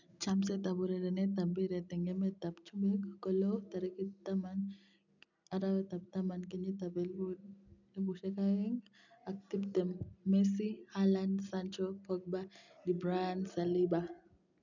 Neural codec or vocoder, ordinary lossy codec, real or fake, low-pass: none; none; real; 7.2 kHz